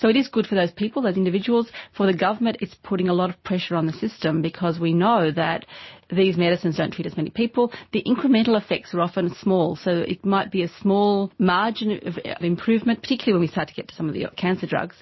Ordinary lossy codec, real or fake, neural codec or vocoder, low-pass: MP3, 24 kbps; real; none; 7.2 kHz